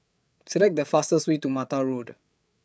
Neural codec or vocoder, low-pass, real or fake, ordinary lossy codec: codec, 16 kHz, 8 kbps, FreqCodec, larger model; none; fake; none